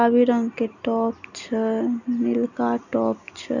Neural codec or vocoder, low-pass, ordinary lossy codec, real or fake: none; 7.2 kHz; none; real